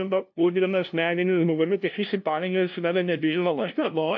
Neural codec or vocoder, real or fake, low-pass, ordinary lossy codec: codec, 16 kHz, 0.5 kbps, FunCodec, trained on LibriTTS, 25 frames a second; fake; 7.2 kHz; AAC, 48 kbps